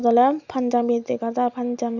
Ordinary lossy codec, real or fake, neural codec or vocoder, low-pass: none; real; none; 7.2 kHz